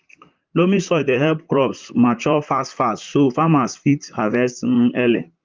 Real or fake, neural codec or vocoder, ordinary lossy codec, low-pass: fake; vocoder, 44.1 kHz, 128 mel bands, Pupu-Vocoder; Opus, 24 kbps; 7.2 kHz